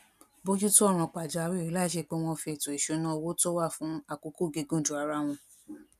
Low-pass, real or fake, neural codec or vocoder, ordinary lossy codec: 14.4 kHz; real; none; none